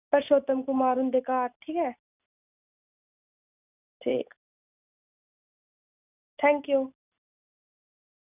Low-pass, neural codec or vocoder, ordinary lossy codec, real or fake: 3.6 kHz; none; none; real